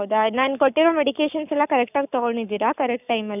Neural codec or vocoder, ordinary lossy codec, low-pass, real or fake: codec, 44.1 kHz, 7.8 kbps, Pupu-Codec; none; 3.6 kHz; fake